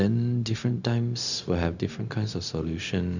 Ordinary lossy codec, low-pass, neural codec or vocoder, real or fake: none; 7.2 kHz; codec, 16 kHz, 0.4 kbps, LongCat-Audio-Codec; fake